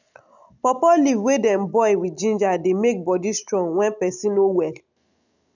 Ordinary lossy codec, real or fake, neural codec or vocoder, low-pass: none; real; none; 7.2 kHz